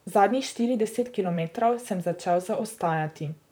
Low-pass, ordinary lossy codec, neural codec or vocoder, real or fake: none; none; vocoder, 44.1 kHz, 128 mel bands, Pupu-Vocoder; fake